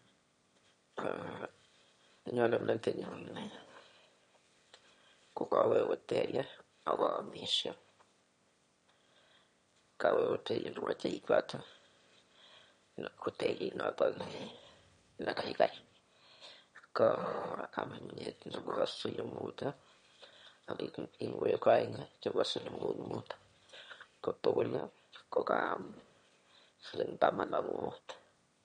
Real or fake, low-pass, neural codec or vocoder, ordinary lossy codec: fake; 9.9 kHz; autoencoder, 22.05 kHz, a latent of 192 numbers a frame, VITS, trained on one speaker; MP3, 48 kbps